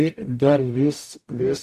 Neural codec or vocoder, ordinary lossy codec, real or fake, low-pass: codec, 44.1 kHz, 0.9 kbps, DAC; AAC, 64 kbps; fake; 14.4 kHz